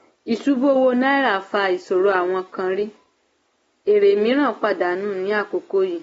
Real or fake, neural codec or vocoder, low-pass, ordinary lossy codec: real; none; 19.8 kHz; AAC, 24 kbps